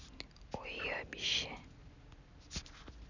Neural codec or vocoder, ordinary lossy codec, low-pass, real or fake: none; none; 7.2 kHz; real